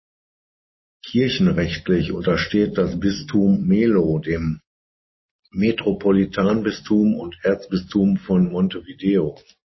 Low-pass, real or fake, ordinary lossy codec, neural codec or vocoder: 7.2 kHz; real; MP3, 24 kbps; none